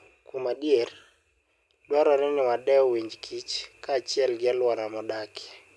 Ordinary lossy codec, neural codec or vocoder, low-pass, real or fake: none; none; none; real